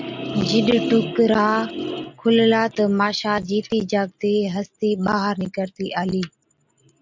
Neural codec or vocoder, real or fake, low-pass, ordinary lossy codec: none; real; 7.2 kHz; MP3, 64 kbps